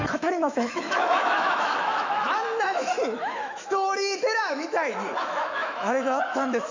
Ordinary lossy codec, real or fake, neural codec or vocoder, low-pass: AAC, 48 kbps; fake; autoencoder, 48 kHz, 128 numbers a frame, DAC-VAE, trained on Japanese speech; 7.2 kHz